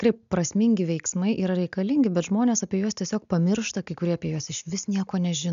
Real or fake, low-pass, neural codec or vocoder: real; 7.2 kHz; none